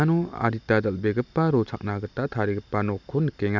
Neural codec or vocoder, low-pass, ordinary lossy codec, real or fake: none; 7.2 kHz; none; real